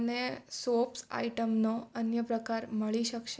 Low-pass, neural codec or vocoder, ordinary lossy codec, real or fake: none; none; none; real